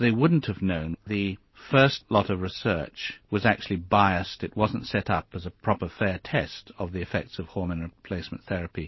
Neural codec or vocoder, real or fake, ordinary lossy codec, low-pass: none; real; MP3, 24 kbps; 7.2 kHz